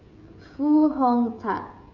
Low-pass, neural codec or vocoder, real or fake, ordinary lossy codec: 7.2 kHz; codec, 16 kHz, 16 kbps, FreqCodec, smaller model; fake; none